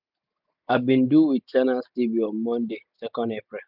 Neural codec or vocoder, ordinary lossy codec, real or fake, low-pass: none; none; real; 5.4 kHz